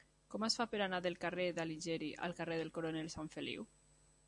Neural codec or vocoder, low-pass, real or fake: none; 10.8 kHz; real